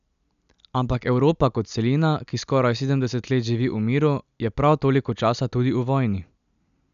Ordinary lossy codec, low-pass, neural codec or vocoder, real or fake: none; 7.2 kHz; none; real